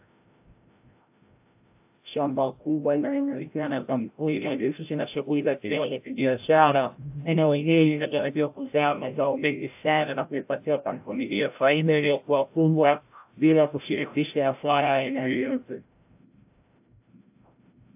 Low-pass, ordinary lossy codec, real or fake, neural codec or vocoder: 3.6 kHz; AAC, 32 kbps; fake; codec, 16 kHz, 0.5 kbps, FreqCodec, larger model